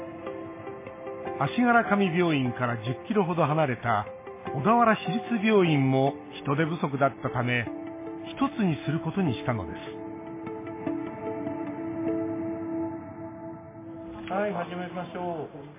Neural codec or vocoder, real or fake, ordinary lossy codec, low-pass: none; real; MP3, 16 kbps; 3.6 kHz